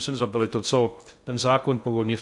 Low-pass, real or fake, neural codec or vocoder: 10.8 kHz; fake; codec, 16 kHz in and 24 kHz out, 0.6 kbps, FocalCodec, streaming, 2048 codes